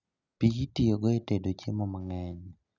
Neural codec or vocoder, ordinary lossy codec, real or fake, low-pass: none; none; real; 7.2 kHz